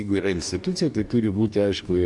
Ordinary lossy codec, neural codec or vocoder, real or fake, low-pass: MP3, 96 kbps; codec, 44.1 kHz, 2.6 kbps, DAC; fake; 10.8 kHz